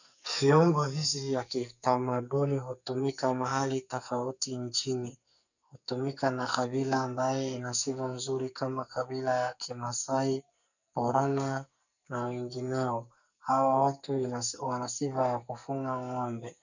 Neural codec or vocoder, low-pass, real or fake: codec, 44.1 kHz, 2.6 kbps, SNAC; 7.2 kHz; fake